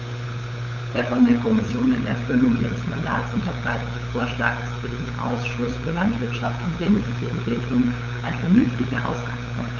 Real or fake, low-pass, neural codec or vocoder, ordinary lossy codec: fake; 7.2 kHz; codec, 16 kHz, 8 kbps, FunCodec, trained on LibriTTS, 25 frames a second; none